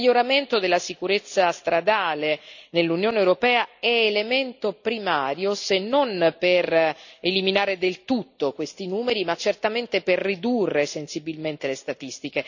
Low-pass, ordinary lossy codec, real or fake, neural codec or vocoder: 7.2 kHz; none; real; none